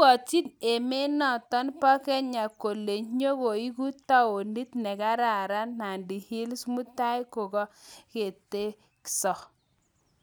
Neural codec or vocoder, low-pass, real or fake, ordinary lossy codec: none; none; real; none